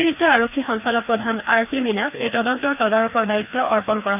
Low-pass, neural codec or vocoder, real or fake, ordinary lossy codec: 3.6 kHz; codec, 16 kHz, 2 kbps, FreqCodec, larger model; fake; none